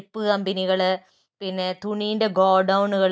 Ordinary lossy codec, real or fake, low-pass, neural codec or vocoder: none; real; none; none